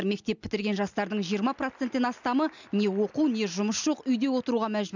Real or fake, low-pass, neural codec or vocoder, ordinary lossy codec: real; 7.2 kHz; none; none